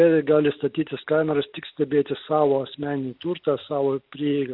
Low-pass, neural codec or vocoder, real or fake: 5.4 kHz; none; real